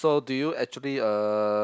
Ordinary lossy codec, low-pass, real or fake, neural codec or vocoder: none; none; real; none